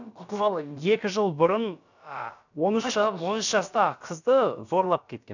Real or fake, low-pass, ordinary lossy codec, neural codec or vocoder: fake; 7.2 kHz; none; codec, 16 kHz, about 1 kbps, DyCAST, with the encoder's durations